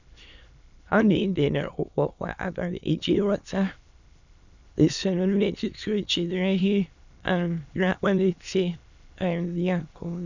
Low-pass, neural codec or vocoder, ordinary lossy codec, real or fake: 7.2 kHz; autoencoder, 22.05 kHz, a latent of 192 numbers a frame, VITS, trained on many speakers; none; fake